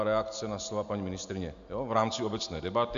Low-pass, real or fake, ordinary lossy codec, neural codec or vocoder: 7.2 kHz; real; AAC, 64 kbps; none